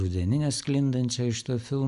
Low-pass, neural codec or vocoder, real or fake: 10.8 kHz; none; real